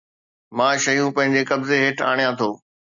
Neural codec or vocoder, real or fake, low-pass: none; real; 7.2 kHz